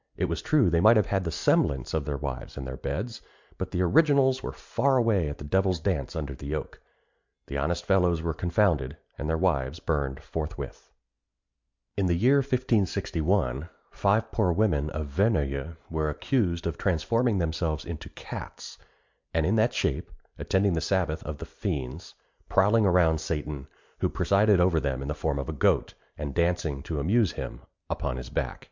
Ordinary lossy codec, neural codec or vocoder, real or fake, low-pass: MP3, 64 kbps; none; real; 7.2 kHz